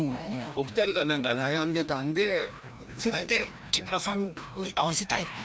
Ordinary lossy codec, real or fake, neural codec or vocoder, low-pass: none; fake; codec, 16 kHz, 1 kbps, FreqCodec, larger model; none